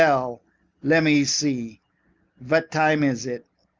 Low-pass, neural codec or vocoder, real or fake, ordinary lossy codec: 7.2 kHz; none; real; Opus, 32 kbps